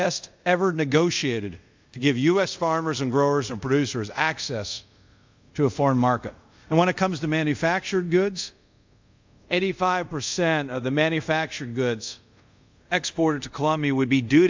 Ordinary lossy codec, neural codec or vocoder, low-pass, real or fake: MP3, 64 kbps; codec, 24 kHz, 0.5 kbps, DualCodec; 7.2 kHz; fake